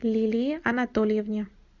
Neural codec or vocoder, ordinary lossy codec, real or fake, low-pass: none; Opus, 64 kbps; real; 7.2 kHz